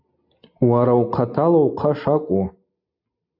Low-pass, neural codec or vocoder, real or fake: 5.4 kHz; none; real